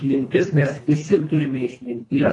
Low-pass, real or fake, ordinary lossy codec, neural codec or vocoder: 10.8 kHz; fake; AAC, 32 kbps; codec, 24 kHz, 1.5 kbps, HILCodec